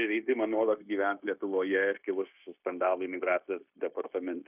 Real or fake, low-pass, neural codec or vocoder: fake; 3.6 kHz; codec, 16 kHz, 0.9 kbps, LongCat-Audio-Codec